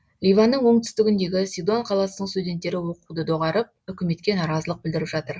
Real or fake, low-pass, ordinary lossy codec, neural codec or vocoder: real; none; none; none